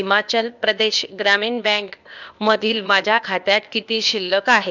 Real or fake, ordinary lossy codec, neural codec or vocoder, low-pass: fake; none; codec, 16 kHz, 0.8 kbps, ZipCodec; 7.2 kHz